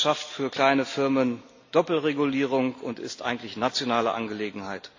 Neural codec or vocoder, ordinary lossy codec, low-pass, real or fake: none; AAC, 48 kbps; 7.2 kHz; real